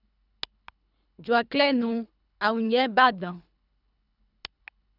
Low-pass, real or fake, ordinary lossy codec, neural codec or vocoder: 5.4 kHz; fake; none; codec, 24 kHz, 3 kbps, HILCodec